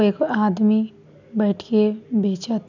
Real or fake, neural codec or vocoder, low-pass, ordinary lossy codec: real; none; 7.2 kHz; none